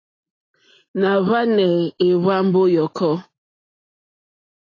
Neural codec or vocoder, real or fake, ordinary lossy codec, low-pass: none; real; AAC, 32 kbps; 7.2 kHz